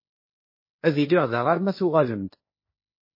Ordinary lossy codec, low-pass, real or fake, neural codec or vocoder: MP3, 24 kbps; 5.4 kHz; fake; codec, 16 kHz, 1.1 kbps, Voila-Tokenizer